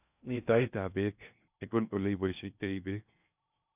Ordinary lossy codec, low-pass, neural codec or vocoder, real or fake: none; 3.6 kHz; codec, 16 kHz in and 24 kHz out, 0.6 kbps, FocalCodec, streaming, 2048 codes; fake